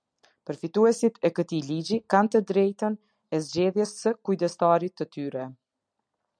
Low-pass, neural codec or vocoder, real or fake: 9.9 kHz; none; real